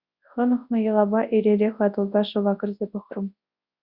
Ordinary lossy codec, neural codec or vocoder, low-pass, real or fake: Opus, 64 kbps; codec, 24 kHz, 0.9 kbps, WavTokenizer, large speech release; 5.4 kHz; fake